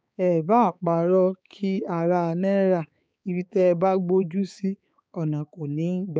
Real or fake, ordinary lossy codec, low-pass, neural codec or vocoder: fake; none; none; codec, 16 kHz, 4 kbps, X-Codec, HuBERT features, trained on balanced general audio